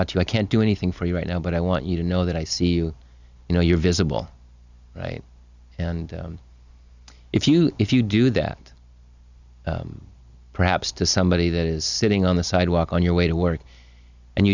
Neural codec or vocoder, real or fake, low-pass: none; real; 7.2 kHz